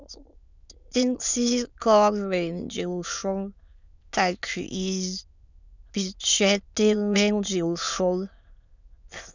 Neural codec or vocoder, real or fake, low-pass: autoencoder, 22.05 kHz, a latent of 192 numbers a frame, VITS, trained on many speakers; fake; 7.2 kHz